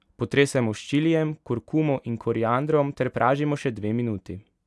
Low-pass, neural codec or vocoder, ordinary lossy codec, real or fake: none; none; none; real